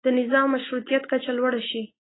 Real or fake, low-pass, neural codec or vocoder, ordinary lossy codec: real; 7.2 kHz; none; AAC, 16 kbps